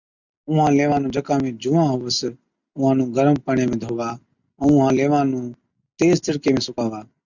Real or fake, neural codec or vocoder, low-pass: real; none; 7.2 kHz